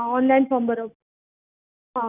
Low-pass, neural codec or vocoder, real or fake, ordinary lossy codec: 3.6 kHz; none; real; none